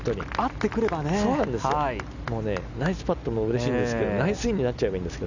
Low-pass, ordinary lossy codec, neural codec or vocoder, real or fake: 7.2 kHz; none; none; real